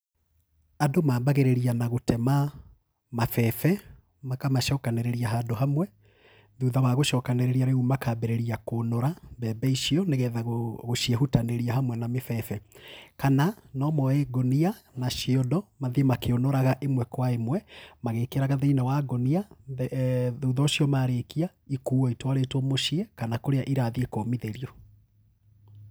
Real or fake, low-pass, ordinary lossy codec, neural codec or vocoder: real; none; none; none